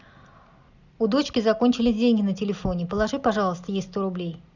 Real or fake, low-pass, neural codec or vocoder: real; 7.2 kHz; none